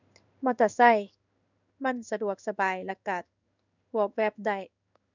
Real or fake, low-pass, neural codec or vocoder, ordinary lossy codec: fake; 7.2 kHz; codec, 16 kHz in and 24 kHz out, 1 kbps, XY-Tokenizer; none